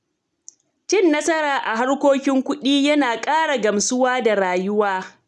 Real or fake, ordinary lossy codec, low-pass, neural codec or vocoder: real; none; none; none